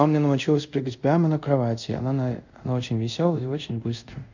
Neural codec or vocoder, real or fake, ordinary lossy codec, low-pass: codec, 24 kHz, 0.5 kbps, DualCodec; fake; none; 7.2 kHz